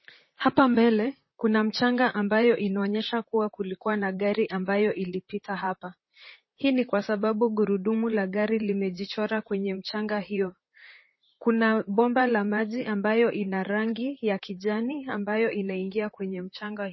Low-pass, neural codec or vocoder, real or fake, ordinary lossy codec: 7.2 kHz; vocoder, 44.1 kHz, 128 mel bands, Pupu-Vocoder; fake; MP3, 24 kbps